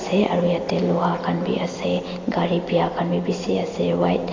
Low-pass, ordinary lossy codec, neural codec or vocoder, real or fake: 7.2 kHz; AAC, 32 kbps; none; real